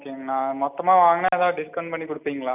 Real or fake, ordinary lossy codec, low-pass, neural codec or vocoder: real; none; 3.6 kHz; none